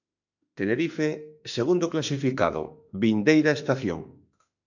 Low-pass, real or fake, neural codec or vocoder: 7.2 kHz; fake; autoencoder, 48 kHz, 32 numbers a frame, DAC-VAE, trained on Japanese speech